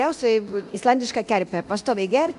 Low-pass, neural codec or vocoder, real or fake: 10.8 kHz; codec, 24 kHz, 0.9 kbps, DualCodec; fake